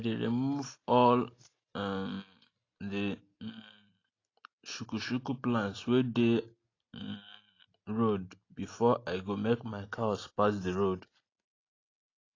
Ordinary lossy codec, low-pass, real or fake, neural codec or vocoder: AAC, 32 kbps; 7.2 kHz; real; none